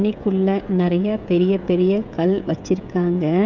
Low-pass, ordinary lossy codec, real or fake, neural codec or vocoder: 7.2 kHz; none; fake; codec, 16 kHz, 16 kbps, FreqCodec, smaller model